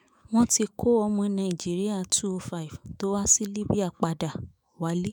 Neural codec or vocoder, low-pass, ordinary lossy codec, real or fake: autoencoder, 48 kHz, 128 numbers a frame, DAC-VAE, trained on Japanese speech; none; none; fake